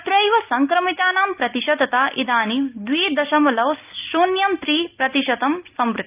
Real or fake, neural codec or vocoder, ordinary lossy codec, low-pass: real; none; Opus, 24 kbps; 3.6 kHz